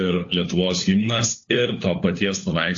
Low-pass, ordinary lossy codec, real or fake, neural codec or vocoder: 7.2 kHz; AAC, 48 kbps; fake; codec, 16 kHz, 4 kbps, FunCodec, trained on LibriTTS, 50 frames a second